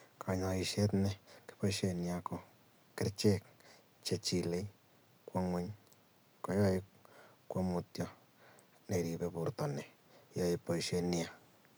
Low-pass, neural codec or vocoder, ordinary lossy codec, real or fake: none; none; none; real